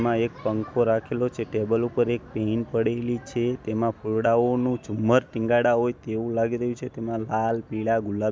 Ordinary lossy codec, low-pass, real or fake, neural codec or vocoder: none; none; real; none